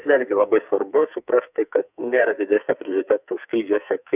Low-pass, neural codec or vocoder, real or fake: 3.6 kHz; codec, 44.1 kHz, 2.6 kbps, SNAC; fake